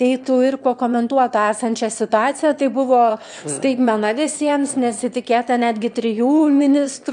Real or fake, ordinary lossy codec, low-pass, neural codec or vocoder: fake; MP3, 96 kbps; 9.9 kHz; autoencoder, 22.05 kHz, a latent of 192 numbers a frame, VITS, trained on one speaker